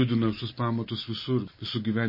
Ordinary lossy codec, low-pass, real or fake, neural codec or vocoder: MP3, 24 kbps; 5.4 kHz; real; none